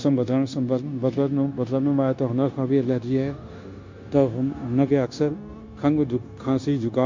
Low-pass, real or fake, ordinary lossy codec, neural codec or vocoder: 7.2 kHz; fake; MP3, 48 kbps; codec, 16 kHz, 0.9 kbps, LongCat-Audio-Codec